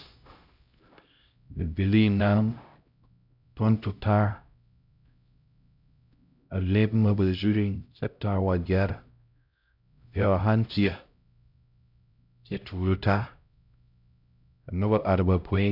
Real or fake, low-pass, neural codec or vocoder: fake; 5.4 kHz; codec, 16 kHz, 0.5 kbps, X-Codec, HuBERT features, trained on LibriSpeech